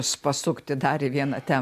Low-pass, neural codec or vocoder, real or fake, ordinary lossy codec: 14.4 kHz; none; real; MP3, 96 kbps